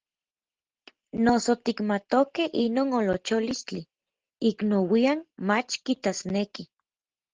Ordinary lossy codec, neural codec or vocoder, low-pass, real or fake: Opus, 16 kbps; none; 7.2 kHz; real